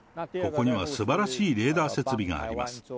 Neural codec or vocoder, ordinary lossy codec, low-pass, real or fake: none; none; none; real